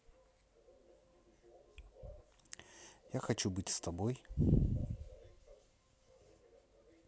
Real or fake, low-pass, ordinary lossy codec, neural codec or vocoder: real; none; none; none